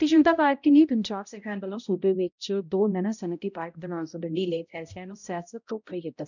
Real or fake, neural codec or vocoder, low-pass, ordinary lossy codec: fake; codec, 16 kHz, 0.5 kbps, X-Codec, HuBERT features, trained on balanced general audio; 7.2 kHz; none